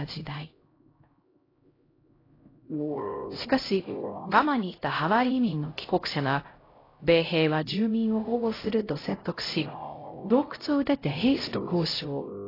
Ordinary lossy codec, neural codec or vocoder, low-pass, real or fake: AAC, 24 kbps; codec, 16 kHz, 0.5 kbps, X-Codec, HuBERT features, trained on LibriSpeech; 5.4 kHz; fake